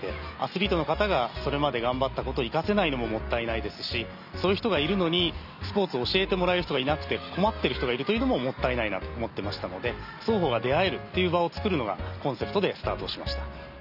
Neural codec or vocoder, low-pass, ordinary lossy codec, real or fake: none; 5.4 kHz; none; real